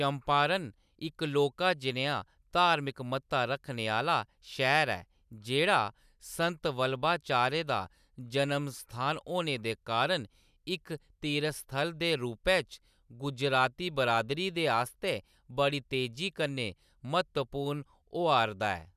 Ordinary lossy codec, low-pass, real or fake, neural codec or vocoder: none; 14.4 kHz; real; none